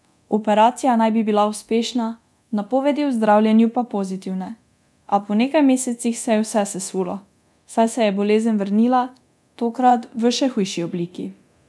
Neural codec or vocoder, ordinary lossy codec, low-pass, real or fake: codec, 24 kHz, 0.9 kbps, DualCodec; none; none; fake